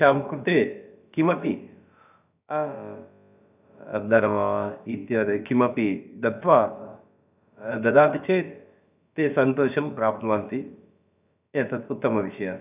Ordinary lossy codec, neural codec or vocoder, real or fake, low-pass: none; codec, 16 kHz, about 1 kbps, DyCAST, with the encoder's durations; fake; 3.6 kHz